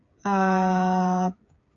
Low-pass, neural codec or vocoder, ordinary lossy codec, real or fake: 7.2 kHz; codec, 16 kHz, 8 kbps, FreqCodec, smaller model; AAC, 48 kbps; fake